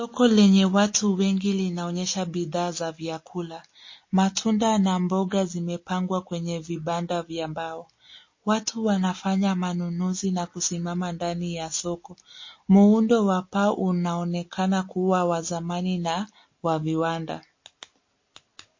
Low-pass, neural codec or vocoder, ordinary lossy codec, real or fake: 7.2 kHz; none; MP3, 32 kbps; real